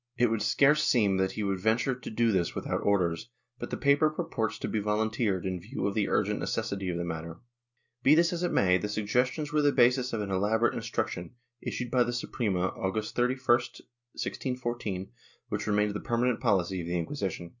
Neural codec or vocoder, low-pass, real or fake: none; 7.2 kHz; real